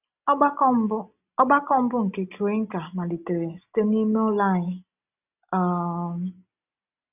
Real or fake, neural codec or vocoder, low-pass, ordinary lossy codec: real; none; 3.6 kHz; none